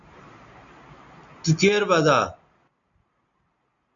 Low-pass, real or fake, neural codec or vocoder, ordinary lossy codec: 7.2 kHz; real; none; MP3, 64 kbps